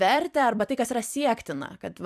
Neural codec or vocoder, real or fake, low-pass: vocoder, 44.1 kHz, 128 mel bands every 256 samples, BigVGAN v2; fake; 14.4 kHz